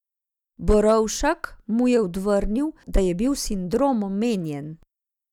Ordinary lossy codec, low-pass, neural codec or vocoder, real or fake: none; 19.8 kHz; none; real